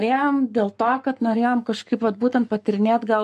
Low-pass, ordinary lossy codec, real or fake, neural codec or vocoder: 14.4 kHz; MP3, 64 kbps; fake; codec, 44.1 kHz, 7.8 kbps, Pupu-Codec